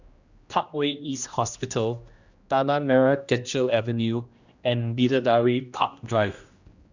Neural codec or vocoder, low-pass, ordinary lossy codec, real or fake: codec, 16 kHz, 1 kbps, X-Codec, HuBERT features, trained on general audio; 7.2 kHz; Opus, 64 kbps; fake